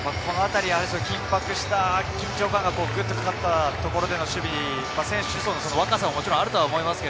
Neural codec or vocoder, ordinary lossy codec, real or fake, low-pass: none; none; real; none